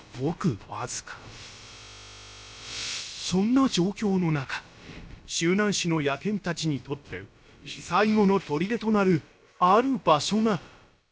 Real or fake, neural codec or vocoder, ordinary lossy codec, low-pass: fake; codec, 16 kHz, about 1 kbps, DyCAST, with the encoder's durations; none; none